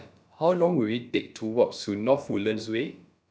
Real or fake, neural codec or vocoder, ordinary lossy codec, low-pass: fake; codec, 16 kHz, about 1 kbps, DyCAST, with the encoder's durations; none; none